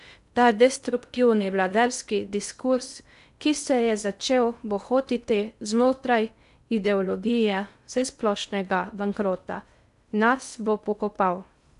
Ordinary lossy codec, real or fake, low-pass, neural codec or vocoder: none; fake; 10.8 kHz; codec, 16 kHz in and 24 kHz out, 0.6 kbps, FocalCodec, streaming, 2048 codes